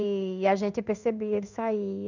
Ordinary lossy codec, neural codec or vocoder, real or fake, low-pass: none; codec, 16 kHz in and 24 kHz out, 1 kbps, XY-Tokenizer; fake; 7.2 kHz